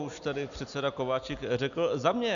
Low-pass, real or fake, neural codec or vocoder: 7.2 kHz; real; none